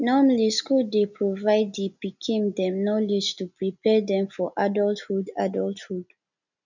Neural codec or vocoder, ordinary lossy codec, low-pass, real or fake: none; none; 7.2 kHz; real